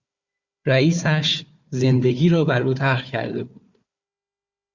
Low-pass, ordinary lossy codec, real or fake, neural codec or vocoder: 7.2 kHz; Opus, 64 kbps; fake; codec, 16 kHz, 4 kbps, FunCodec, trained on Chinese and English, 50 frames a second